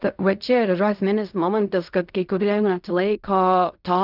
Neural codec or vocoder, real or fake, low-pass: codec, 16 kHz in and 24 kHz out, 0.4 kbps, LongCat-Audio-Codec, fine tuned four codebook decoder; fake; 5.4 kHz